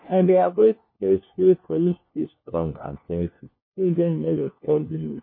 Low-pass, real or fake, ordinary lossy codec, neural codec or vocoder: 5.4 kHz; fake; MP3, 24 kbps; codec, 16 kHz, 1 kbps, FunCodec, trained on LibriTTS, 50 frames a second